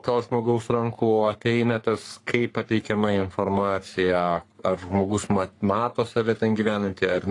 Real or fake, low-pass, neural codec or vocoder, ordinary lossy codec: fake; 10.8 kHz; codec, 44.1 kHz, 3.4 kbps, Pupu-Codec; AAC, 48 kbps